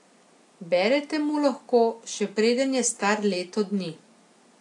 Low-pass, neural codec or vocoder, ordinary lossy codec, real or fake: 10.8 kHz; none; AAC, 48 kbps; real